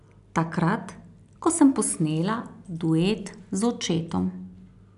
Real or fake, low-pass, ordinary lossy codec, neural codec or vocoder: real; 10.8 kHz; none; none